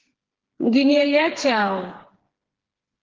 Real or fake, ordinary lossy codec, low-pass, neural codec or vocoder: fake; Opus, 16 kbps; 7.2 kHz; codec, 16 kHz, 4 kbps, FreqCodec, smaller model